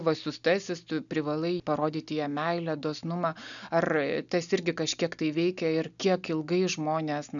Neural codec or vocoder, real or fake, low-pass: none; real; 7.2 kHz